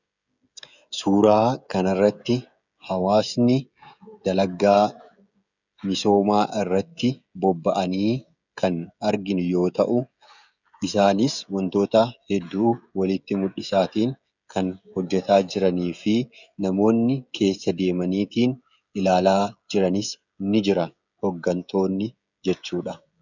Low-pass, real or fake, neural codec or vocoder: 7.2 kHz; fake; codec, 16 kHz, 16 kbps, FreqCodec, smaller model